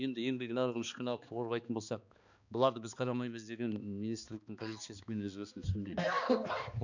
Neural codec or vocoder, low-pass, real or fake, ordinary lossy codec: codec, 16 kHz, 2 kbps, X-Codec, HuBERT features, trained on balanced general audio; 7.2 kHz; fake; none